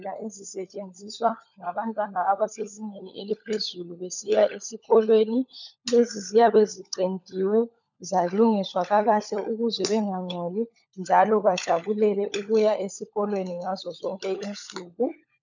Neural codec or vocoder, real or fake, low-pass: codec, 16 kHz, 16 kbps, FunCodec, trained on LibriTTS, 50 frames a second; fake; 7.2 kHz